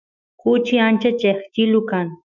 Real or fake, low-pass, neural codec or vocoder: fake; 7.2 kHz; autoencoder, 48 kHz, 128 numbers a frame, DAC-VAE, trained on Japanese speech